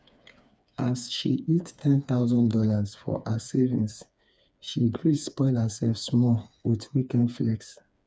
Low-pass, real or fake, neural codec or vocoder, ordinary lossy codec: none; fake; codec, 16 kHz, 4 kbps, FreqCodec, smaller model; none